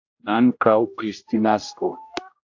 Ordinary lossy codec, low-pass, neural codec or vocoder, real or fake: AAC, 48 kbps; 7.2 kHz; codec, 16 kHz, 0.5 kbps, X-Codec, HuBERT features, trained on general audio; fake